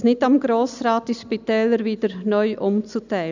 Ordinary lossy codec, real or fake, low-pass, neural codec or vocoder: none; real; 7.2 kHz; none